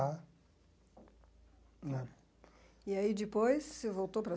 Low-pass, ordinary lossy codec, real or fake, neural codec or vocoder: none; none; real; none